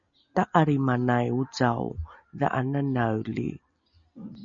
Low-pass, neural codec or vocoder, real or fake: 7.2 kHz; none; real